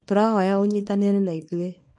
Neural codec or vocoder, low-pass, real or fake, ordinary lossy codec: codec, 24 kHz, 0.9 kbps, WavTokenizer, small release; 10.8 kHz; fake; MP3, 48 kbps